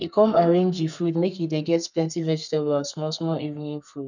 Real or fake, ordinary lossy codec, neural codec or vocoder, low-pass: fake; none; codec, 32 kHz, 1.9 kbps, SNAC; 7.2 kHz